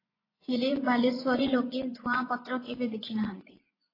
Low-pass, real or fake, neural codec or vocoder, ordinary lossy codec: 5.4 kHz; fake; vocoder, 24 kHz, 100 mel bands, Vocos; AAC, 24 kbps